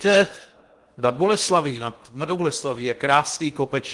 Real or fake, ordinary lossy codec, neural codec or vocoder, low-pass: fake; Opus, 24 kbps; codec, 16 kHz in and 24 kHz out, 0.8 kbps, FocalCodec, streaming, 65536 codes; 10.8 kHz